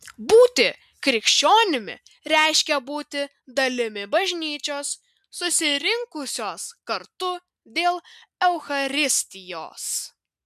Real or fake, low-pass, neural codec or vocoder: real; 14.4 kHz; none